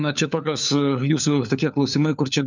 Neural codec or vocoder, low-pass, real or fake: codec, 16 kHz, 4 kbps, FunCodec, trained on LibriTTS, 50 frames a second; 7.2 kHz; fake